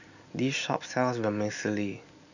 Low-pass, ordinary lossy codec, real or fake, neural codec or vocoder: 7.2 kHz; none; real; none